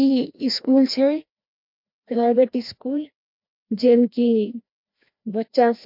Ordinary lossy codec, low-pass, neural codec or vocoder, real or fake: MP3, 48 kbps; 5.4 kHz; codec, 16 kHz, 1 kbps, FreqCodec, larger model; fake